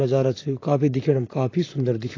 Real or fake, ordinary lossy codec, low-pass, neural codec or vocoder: fake; AAC, 32 kbps; 7.2 kHz; vocoder, 44.1 kHz, 128 mel bands, Pupu-Vocoder